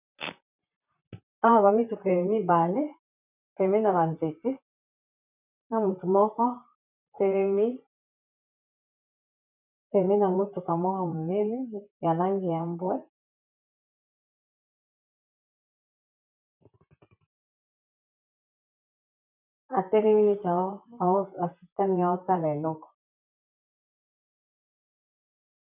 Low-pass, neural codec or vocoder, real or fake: 3.6 kHz; vocoder, 44.1 kHz, 128 mel bands, Pupu-Vocoder; fake